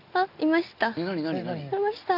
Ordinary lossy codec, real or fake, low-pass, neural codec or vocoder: none; real; 5.4 kHz; none